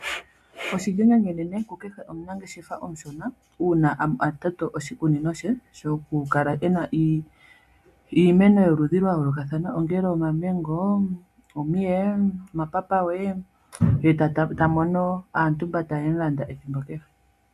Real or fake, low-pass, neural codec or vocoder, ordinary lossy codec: real; 14.4 kHz; none; AAC, 96 kbps